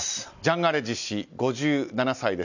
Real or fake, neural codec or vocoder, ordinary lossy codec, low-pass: real; none; none; 7.2 kHz